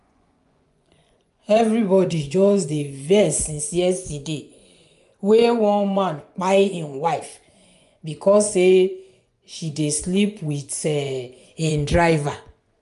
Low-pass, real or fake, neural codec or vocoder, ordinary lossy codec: 10.8 kHz; fake; vocoder, 24 kHz, 100 mel bands, Vocos; none